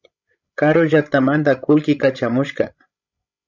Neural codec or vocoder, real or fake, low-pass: codec, 16 kHz, 16 kbps, FreqCodec, larger model; fake; 7.2 kHz